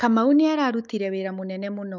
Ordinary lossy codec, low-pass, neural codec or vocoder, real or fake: none; 7.2 kHz; autoencoder, 48 kHz, 128 numbers a frame, DAC-VAE, trained on Japanese speech; fake